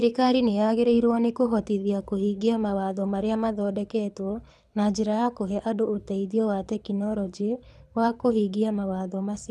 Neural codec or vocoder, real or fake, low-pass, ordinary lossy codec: codec, 24 kHz, 6 kbps, HILCodec; fake; none; none